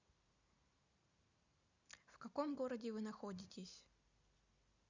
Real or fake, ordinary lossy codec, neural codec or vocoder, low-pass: real; Opus, 64 kbps; none; 7.2 kHz